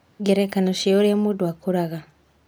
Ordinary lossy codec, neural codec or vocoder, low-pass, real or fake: none; none; none; real